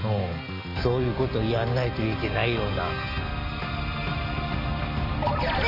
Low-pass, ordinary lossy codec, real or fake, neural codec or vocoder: 5.4 kHz; AAC, 48 kbps; real; none